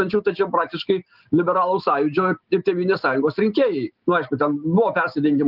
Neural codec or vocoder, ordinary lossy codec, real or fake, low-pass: none; Opus, 32 kbps; real; 5.4 kHz